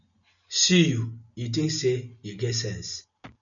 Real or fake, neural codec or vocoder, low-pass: real; none; 7.2 kHz